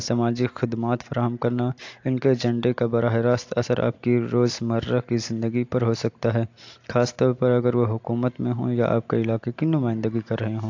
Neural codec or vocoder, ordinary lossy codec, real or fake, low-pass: none; AAC, 48 kbps; real; 7.2 kHz